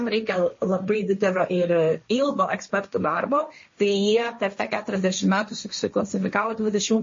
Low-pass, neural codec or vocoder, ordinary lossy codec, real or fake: 7.2 kHz; codec, 16 kHz, 1.1 kbps, Voila-Tokenizer; MP3, 32 kbps; fake